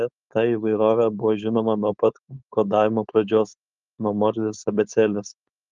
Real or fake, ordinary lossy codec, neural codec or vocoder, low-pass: fake; Opus, 32 kbps; codec, 16 kHz, 4.8 kbps, FACodec; 7.2 kHz